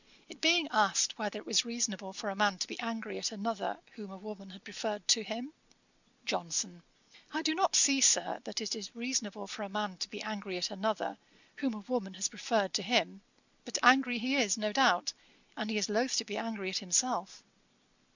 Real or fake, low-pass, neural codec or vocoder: real; 7.2 kHz; none